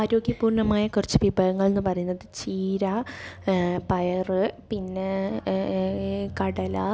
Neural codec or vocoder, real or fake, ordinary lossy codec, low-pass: none; real; none; none